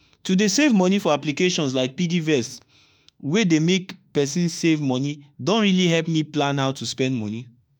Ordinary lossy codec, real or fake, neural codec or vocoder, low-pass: none; fake; autoencoder, 48 kHz, 32 numbers a frame, DAC-VAE, trained on Japanese speech; none